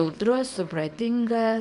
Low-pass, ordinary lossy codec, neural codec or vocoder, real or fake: 10.8 kHz; AAC, 96 kbps; codec, 24 kHz, 0.9 kbps, WavTokenizer, small release; fake